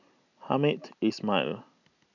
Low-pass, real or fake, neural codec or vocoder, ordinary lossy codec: 7.2 kHz; real; none; none